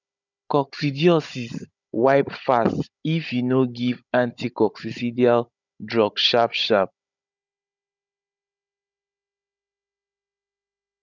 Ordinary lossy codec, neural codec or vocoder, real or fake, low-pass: none; codec, 16 kHz, 16 kbps, FunCodec, trained on Chinese and English, 50 frames a second; fake; 7.2 kHz